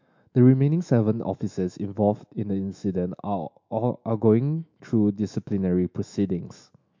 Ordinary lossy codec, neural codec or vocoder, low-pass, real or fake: MP3, 48 kbps; autoencoder, 48 kHz, 128 numbers a frame, DAC-VAE, trained on Japanese speech; 7.2 kHz; fake